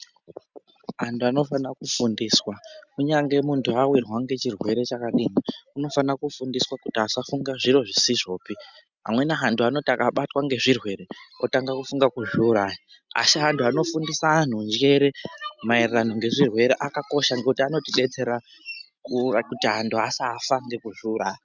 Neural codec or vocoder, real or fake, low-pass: none; real; 7.2 kHz